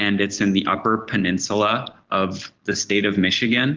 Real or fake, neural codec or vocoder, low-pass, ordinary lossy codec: real; none; 7.2 kHz; Opus, 16 kbps